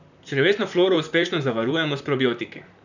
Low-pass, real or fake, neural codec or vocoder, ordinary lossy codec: 7.2 kHz; fake; vocoder, 44.1 kHz, 128 mel bands, Pupu-Vocoder; none